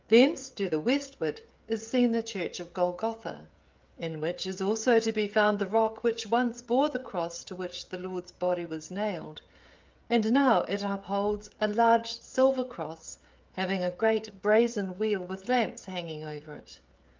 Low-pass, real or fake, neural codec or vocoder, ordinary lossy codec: 7.2 kHz; fake; codec, 16 kHz, 16 kbps, FreqCodec, smaller model; Opus, 24 kbps